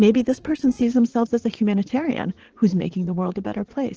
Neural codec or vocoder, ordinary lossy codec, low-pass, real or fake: vocoder, 44.1 kHz, 80 mel bands, Vocos; Opus, 16 kbps; 7.2 kHz; fake